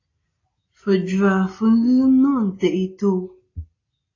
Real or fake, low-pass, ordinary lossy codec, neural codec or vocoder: real; 7.2 kHz; AAC, 32 kbps; none